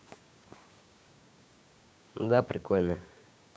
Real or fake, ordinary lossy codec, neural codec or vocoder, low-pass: fake; none; codec, 16 kHz, 6 kbps, DAC; none